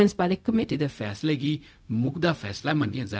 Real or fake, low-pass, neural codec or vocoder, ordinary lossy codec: fake; none; codec, 16 kHz, 0.4 kbps, LongCat-Audio-Codec; none